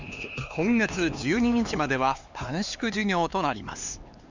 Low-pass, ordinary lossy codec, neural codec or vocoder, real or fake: 7.2 kHz; Opus, 64 kbps; codec, 16 kHz, 4 kbps, X-Codec, HuBERT features, trained on LibriSpeech; fake